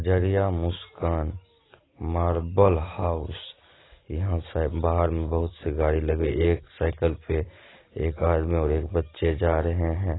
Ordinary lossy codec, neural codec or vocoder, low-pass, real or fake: AAC, 16 kbps; none; 7.2 kHz; real